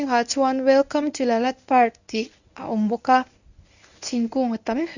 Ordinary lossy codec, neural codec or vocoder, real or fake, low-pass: none; codec, 24 kHz, 0.9 kbps, WavTokenizer, medium speech release version 1; fake; 7.2 kHz